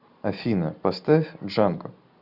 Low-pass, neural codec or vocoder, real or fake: 5.4 kHz; none; real